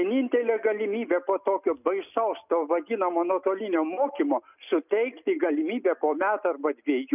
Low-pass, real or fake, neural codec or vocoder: 3.6 kHz; real; none